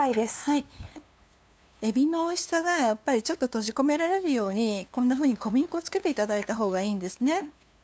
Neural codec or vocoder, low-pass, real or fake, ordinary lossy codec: codec, 16 kHz, 2 kbps, FunCodec, trained on LibriTTS, 25 frames a second; none; fake; none